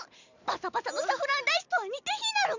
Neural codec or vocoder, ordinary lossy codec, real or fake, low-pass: none; none; real; 7.2 kHz